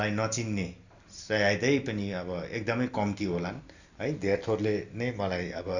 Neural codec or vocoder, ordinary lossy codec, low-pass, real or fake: none; none; 7.2 kHz; real